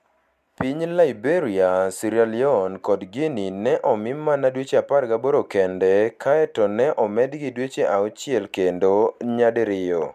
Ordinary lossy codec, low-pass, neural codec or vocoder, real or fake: MP3, 96 kbps; 14.4 kHz; none; real